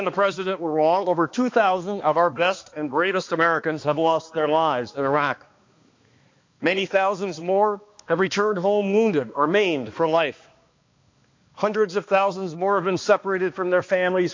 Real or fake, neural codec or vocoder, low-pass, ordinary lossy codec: fake; codec, 16 kHz, 2 kbps, X-Codec, HuBERT features, trained on balanced general audio; 7.2 kHz; MP3, 48 kbps